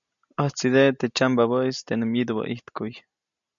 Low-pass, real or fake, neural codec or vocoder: 7.2 kHz; real; none